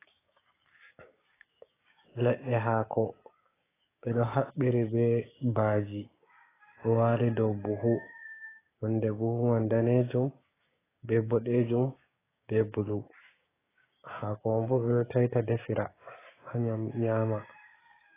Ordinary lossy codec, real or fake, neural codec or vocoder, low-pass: AAC, 16 kbps; fake; codec, 16 kHz, 6 kbps, DAC; 3.6 kHz